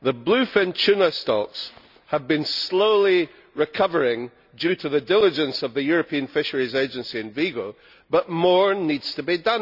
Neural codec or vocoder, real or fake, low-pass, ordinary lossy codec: none; real; 5.4 kHz; none